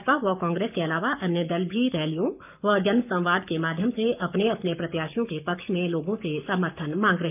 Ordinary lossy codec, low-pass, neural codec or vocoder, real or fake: none; 3.6 kHz; codec, 44.1 kHz, 7.8 kbps, Pupu-Codec; fake